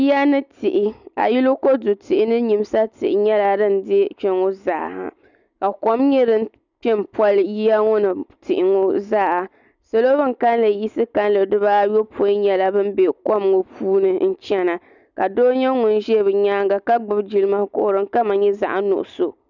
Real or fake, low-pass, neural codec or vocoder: real; 7.2 kHz; none